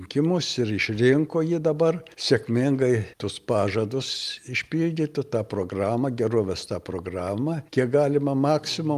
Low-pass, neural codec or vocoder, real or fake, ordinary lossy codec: 14.4 kHz; none; real; Opus, 32 kbps